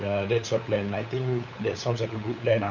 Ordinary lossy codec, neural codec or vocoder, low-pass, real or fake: none; codec, 16 kHz, 8 kbps, FunCodec, trained on LibriTTS, 25 frames a second; 7.2 kHz; fake